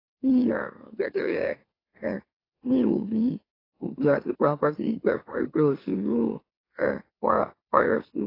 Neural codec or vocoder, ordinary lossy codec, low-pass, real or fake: autoencoder, 44.1 kHz, a latent of 192 numbers a frame, MeloTTS; AAC, 24 kbps; 5.4 kHz; fake